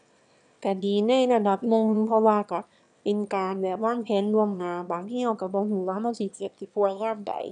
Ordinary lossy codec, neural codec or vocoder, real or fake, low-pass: none; autoencoder, 22.05 kHz, a latent of 192 numbers a frame, VITS, trained on one speaker; fake; 9.9 kHz